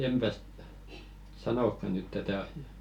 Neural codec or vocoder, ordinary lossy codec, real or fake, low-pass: none; none; real; 19.8 kHz